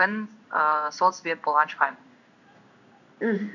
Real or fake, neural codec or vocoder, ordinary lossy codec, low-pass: fake; codec, 16 kHz in and 24 kHz out, 1 kbps, XY-Tokenizer; none; 7.2 kHz